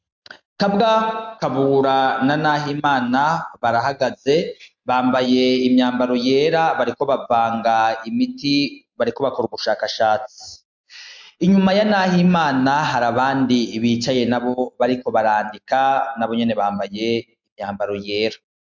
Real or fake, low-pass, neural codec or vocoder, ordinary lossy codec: real; 7.2 kHz; none; MP3, 64 kbps